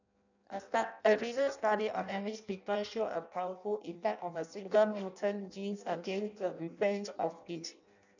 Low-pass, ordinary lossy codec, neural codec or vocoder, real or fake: 7.2 kHz; none; codec, 16 kHz in and 24 kHz out, 0.6 kbps, FireRedTTS-2 codec; fake